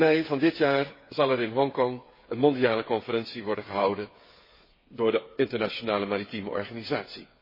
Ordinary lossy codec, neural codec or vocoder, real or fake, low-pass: MP3, 24 kbps; codec, 16 kHz, 8 kbps, FreqCodec, smaller model; fake; 5.4 kHz